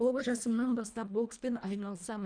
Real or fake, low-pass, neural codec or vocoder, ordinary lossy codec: fake; 9.9 kHz; codec, 24 kHz, 1.5 kbps, HILCodec; none